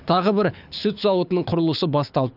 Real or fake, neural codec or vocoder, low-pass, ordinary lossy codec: fake; codec, 24 kHz, 6 kbps, HILCodec; 5.4 kHz; none